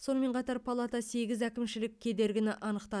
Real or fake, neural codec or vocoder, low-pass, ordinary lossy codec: real; none; none; none